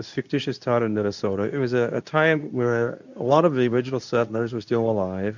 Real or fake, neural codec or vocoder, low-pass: fake; codec, 24 kHz, 0.9 kbps, WavTokenizer, medium speech release version 2; 7.2 kHz